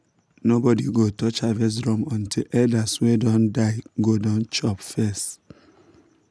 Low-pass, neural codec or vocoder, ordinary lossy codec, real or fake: none; none; none; real